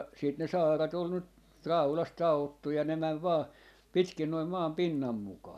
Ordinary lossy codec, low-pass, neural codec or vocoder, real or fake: none; 14.4 kHz; none; real